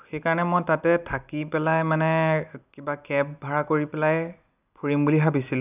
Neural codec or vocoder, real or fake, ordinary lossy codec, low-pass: none; real; none; 3.6 kHz